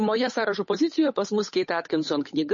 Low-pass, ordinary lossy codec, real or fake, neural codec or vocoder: 7.2 kHz; MP3, 32 kbps; fake; codec, 16 kHz, 8 kbps, FunCodec, trained on Chinese and English, 25 frames a second